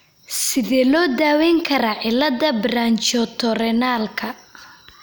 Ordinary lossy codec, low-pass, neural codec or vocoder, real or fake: none; none; none; real